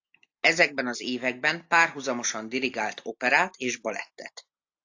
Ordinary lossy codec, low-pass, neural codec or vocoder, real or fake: AAC, 48 kbps; 7.2 kHz; none; real